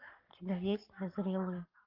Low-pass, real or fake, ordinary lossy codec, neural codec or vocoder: 5.4 kHz; fake; Opus, 32 kbps; codec, 44.1 kHz, 7.8 kbps, Pupu-Codec